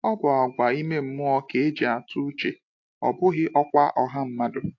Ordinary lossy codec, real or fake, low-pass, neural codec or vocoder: AAC, 48 kbps; real; 7.2 kHz; none